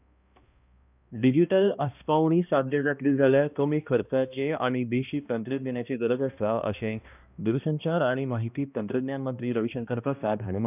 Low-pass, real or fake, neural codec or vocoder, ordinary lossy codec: 3.6 kHz; fake; codec, 16 kHz, 1 kbps, X-Codec, HuBERT features, trained on balanced general audio; none